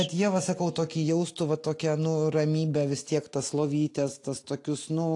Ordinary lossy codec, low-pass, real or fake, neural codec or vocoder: AAC, 48 kbps; 10.8 kHz; real; none